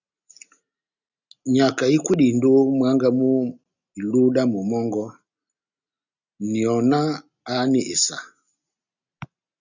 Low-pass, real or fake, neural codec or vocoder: 7.2 kHz; real; none